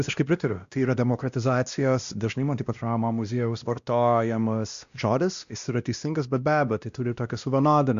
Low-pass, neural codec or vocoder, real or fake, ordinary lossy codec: 7.2 kHz; codec, 16 kHz, 1 kbps, X-Codec, WavLM features, trained on Multilingual LibriSpeech; fake; Opus, 64 kbps